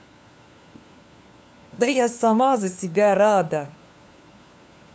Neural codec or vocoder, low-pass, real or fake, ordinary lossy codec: codec, 16 kHz, 4 kbps, FunCodec, trained on LibriTTS, 50 frames a second; none; fake; none